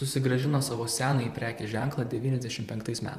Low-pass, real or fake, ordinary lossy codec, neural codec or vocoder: 14.4 kHz; fake; AAC, 96 kbps; vocoder, 44.1 kHz, 128 mel bands, Pupu-Vocoder